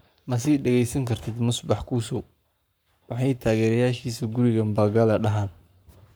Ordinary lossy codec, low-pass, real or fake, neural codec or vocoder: none; none; fake; codec, 44.1 kHz, 7.8 kbps, Pupu-Codec